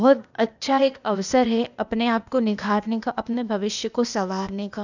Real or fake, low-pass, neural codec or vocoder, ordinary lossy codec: fake; 7.2 kHz; codec, 16 kHz, 0.8 kbps, ZipCodec; none